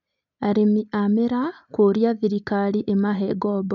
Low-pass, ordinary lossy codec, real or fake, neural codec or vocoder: 7.2 kHz; none; real; none